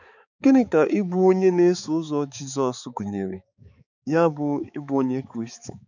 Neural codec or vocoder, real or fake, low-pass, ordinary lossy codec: codec, 16 kHz, 4 kbps, X-Codec, HuBERT features, trained on balanced general audio; fake; 7.2 kHz; none